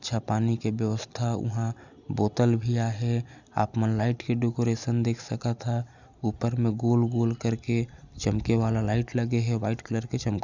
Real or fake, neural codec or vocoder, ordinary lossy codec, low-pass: real; none; none; 7.2 kHz